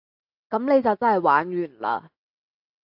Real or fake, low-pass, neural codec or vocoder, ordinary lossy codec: real; 5.4 kHz; none; AAC, 48 kbps